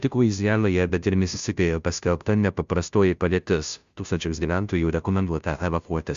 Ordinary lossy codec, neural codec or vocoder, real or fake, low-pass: Opus, 64 kbps; codec, 16 kHz, 0.5 kbps, FunCodec, trained on Chinese and English, 25 frames a second; fake; 7.2 kHz